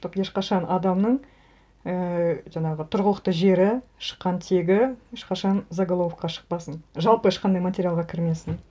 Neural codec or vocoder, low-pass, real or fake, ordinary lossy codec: none; none; real; none